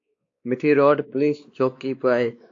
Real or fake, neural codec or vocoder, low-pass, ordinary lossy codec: fake; codec, 16 kHz, 2 kbps, X-Codec, WavLM features, trained on Multilingual LibriSpeech; 7.2 kHz; AAC, 48 kbps